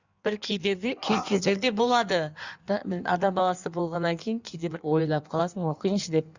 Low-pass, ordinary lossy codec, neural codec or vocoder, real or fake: 7.2 kHz; Opus, 64 kbps; codec, 16 kHz in and 24 kHz out, 1.1 kbps, FireRedTTS-2 codec; fake